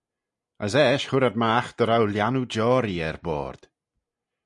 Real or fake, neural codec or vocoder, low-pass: real; none; 10.8 kHz